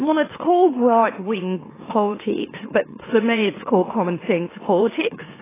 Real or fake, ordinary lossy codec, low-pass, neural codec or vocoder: fake; AAC, 16 kbps; 3.6 kHz; autoencoder, 44.1 kHz, a latent of 192 numbers a frame, MeloTTS